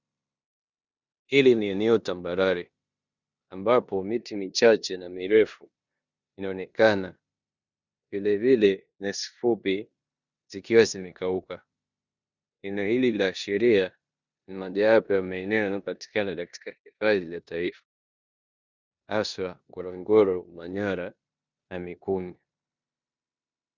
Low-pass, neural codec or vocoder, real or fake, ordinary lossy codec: 7.2 kHz; codec, 16 kHz in and 24 kHz out, 0.9 kbps, LongCat-Audio-Codec, four codebook decoder; fake; Opus, 64 kbps